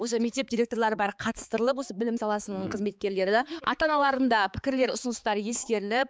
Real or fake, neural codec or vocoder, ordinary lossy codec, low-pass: fake; codec, 16 kHz, 4 kbps, X-Codec, HuBERT features, trained on balanced general audio; none; none